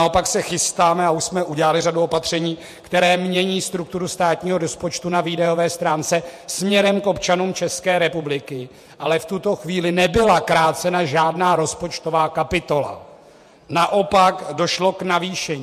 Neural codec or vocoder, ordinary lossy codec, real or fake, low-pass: vocoder, 48 kHz, 128 mel bands, Vocos; MP3, 64 kbps; fake; 14.4 kHz